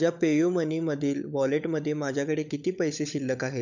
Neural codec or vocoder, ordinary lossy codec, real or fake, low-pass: codec, 16 kHz, 16 kbps, FunCodec, trained on LibriTTS, 50 frames a second; none; fake; 7.2 kHz